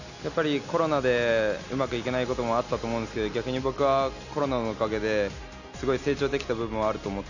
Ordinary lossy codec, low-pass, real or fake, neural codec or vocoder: none; 7.2 kHz; real; none